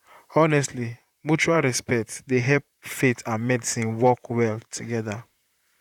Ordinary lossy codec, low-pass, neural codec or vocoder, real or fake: none; 19.8 kHz; vocoder, 44.1 kHz, 128 mel bands, Pupu-Vocoder; fake